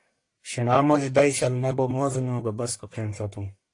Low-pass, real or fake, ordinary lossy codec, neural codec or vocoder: 10.8 kHz; fake; AAC, 48 kbps; codec, 44.1 kHz, 1.7 kbps, Pupu-Codec